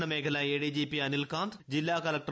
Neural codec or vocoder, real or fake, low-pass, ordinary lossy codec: none; real; none; none